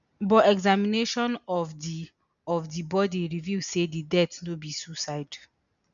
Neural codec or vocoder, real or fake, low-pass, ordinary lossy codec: none; real; 7.2 kHz; none